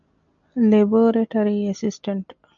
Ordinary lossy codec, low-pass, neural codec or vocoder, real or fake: MP3, 64 kbps; 7.2 kHz; none; real